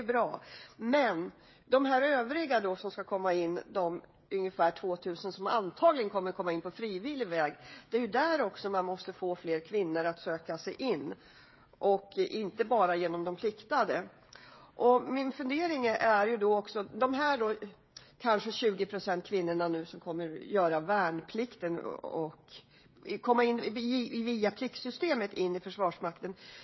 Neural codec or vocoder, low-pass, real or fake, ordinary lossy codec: codec, 16 kHz, 16 kbps, FreqCodec, smaller model; 7.2 kHz; fake; MP3, 24 kbps